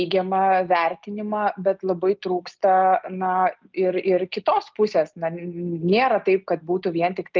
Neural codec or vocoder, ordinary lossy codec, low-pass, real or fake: none; Opus, 24 kbps; 7.2 kHz; real